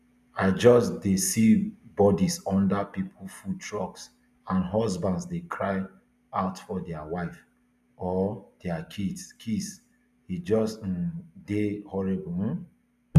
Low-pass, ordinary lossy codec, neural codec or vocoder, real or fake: 14.4 kHz; none; none; real